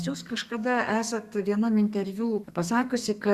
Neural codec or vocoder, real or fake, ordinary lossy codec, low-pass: codec, 32 kHz, 1.9 kbps, SNAC; fake; Opus, 64 kbps; 14.4 kHz